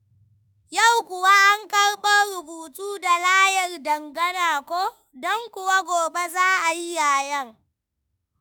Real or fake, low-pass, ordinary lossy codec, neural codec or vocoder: fake; none; none; autoencoder, 48 kHz, 32 numbers a frame, DAC-VAE, trained on Japanese speech